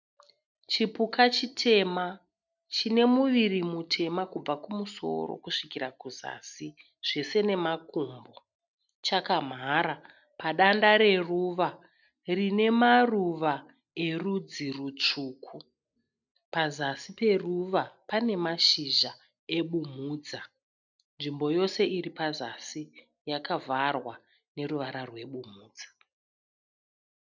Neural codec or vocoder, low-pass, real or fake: none; 7.2 kHz; real